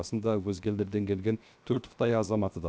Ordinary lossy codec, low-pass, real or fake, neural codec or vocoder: none; none; fake; codec, 16 kHz, 0.7 kbps, FocalCodec